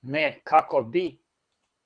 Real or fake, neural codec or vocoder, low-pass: fake; codec, 24 kHz, 6 kbps, HILCodec; 9.9 kHz